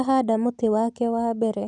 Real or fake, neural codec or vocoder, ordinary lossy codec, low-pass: real; none; none; 10.8 kHz